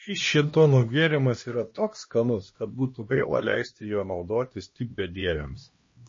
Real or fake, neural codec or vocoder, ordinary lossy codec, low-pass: fake; codec, 16 kHz, 1 kbps, X-Codec, HuBERT features, trained on LibriSpeech; MP3, 32 kbps; 7.2 kHz